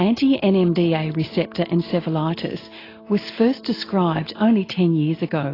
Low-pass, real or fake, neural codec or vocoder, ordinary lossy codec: 5.4 kHz; real; none; AAC, 24 kbps